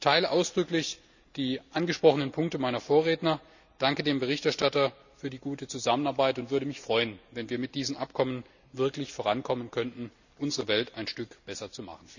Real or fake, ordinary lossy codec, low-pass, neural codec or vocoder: real; none; 7.2 kHz; none